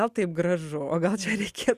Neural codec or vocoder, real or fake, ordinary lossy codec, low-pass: none; real; Opus, 64 kbps; 14.4 kHz